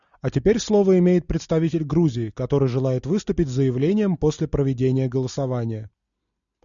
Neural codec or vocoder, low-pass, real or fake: none; 7.2 kHz; real